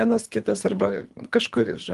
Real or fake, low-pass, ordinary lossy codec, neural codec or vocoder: fake; 10.8 kHz; Opus, 24 kbps; codec, 24 kHz, 3 kbps, HILCodec